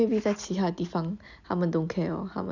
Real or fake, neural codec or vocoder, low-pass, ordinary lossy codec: real; none; 7.2 kHz; none